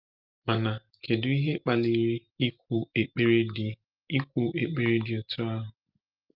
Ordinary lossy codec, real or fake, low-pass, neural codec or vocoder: Opus, 24 kbps; real; 5.4 kHz; none